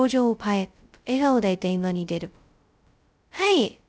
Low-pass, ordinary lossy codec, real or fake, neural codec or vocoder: none; none; fake; codec, 16 kHz, 0.2 kbps, FocalCodec